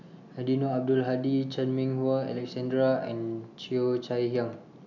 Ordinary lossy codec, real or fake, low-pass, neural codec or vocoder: none; real; 7.2 kHz; none